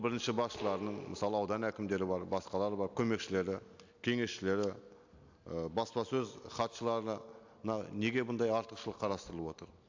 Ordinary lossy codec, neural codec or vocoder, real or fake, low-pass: AAC, 48 kbps; none; real; 7.2 kHz